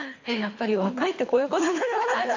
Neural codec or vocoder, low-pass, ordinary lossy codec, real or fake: codec, 24 kHz, 3 kbps, HILCodec; 7.2 kHz; AAC, 48 kbps; fake